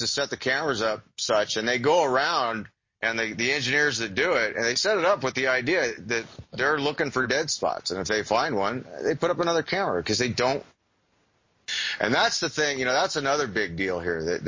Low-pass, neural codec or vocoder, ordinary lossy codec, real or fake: 7.2 kHz; none; MP3, 32 kbps; real